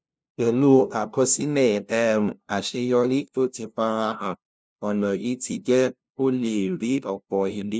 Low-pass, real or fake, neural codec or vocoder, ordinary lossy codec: none; fake; codec, 16 kHz, 0.5 kbps, FunCodec, trained on LibriTTS, 25 frames a second; none